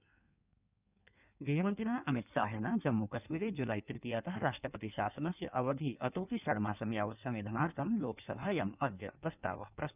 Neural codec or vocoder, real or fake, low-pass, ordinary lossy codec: codec, 16 kHz in and 24 kHz out, 1.1 kbps, FireRedTTS-2 codec; fake; 3.6 kHz; none